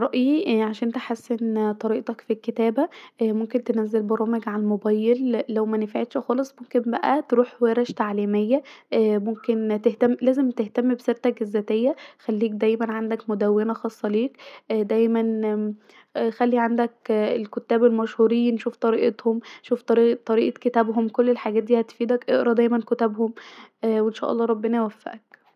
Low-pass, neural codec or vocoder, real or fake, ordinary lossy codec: 14.4 kHz; none; real; none